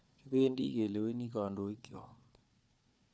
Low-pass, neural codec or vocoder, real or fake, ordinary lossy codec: none; codec, 16 kHz, 4 kbps, FunCodec, trained on Chinese and English, 50 frames a second; fake; none